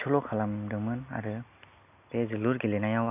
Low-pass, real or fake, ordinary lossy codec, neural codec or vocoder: 3.6 kHz; real; none; none